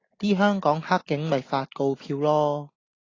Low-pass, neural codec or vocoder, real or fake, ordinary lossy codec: 7.2 kHz; none; real; AAC, 32 kbps